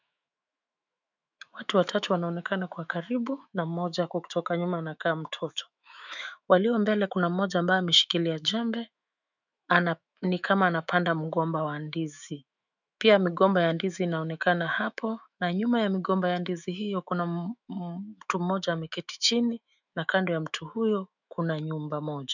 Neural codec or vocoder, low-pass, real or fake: autoencoder, 48 kHz, 128 numbers a frame, DAC-VAE, trained on Japanese speech; 7.2 kHz; fake